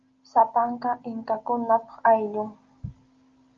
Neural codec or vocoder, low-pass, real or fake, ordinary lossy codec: none; 7.2 kHz; real; Opus, 32 kbps